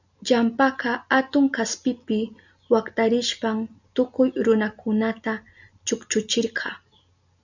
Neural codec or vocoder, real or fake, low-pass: none; real; 7.2 kHz